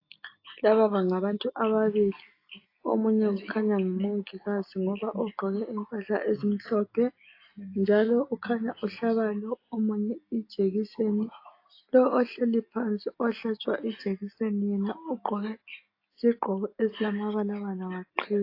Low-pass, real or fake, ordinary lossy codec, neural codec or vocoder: 5.4 kHz; real; AAC, 32 kbps; none